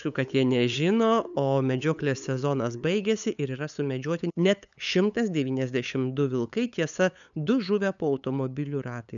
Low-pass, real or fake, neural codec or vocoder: 7.2 kHz; fake; codec, 16 kHz, 8 kbps, FunCodec, trained on LibriTTS, 25 frames a second